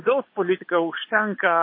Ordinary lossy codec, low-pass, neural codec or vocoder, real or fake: MP3, 24 kbps; 5.4 kHz; vocoder, 22.05 kHz, 80 mel bands, Vocos; fake